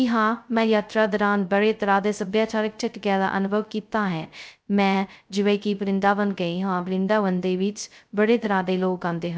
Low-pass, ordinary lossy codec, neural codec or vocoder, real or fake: none; none; codec, 16 kHz, 0.2 kbps, FocalCodec; fake